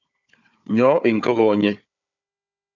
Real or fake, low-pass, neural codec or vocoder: fake; 7.2 kHz; codec, 16 kHz, 4 kbps, FunCodec, trained on Chinese and English, 50 frames a second